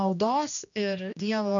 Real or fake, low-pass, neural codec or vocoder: fake; 7.2 kHz; codec, 16 kHz, 1 kbps, X-Codec, HuBERT features, trained on general audio